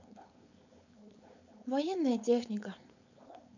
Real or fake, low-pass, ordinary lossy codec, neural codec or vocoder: fake; 7.2 kHz; none; codec, 16 kHz, 4.8 kbps, FACodec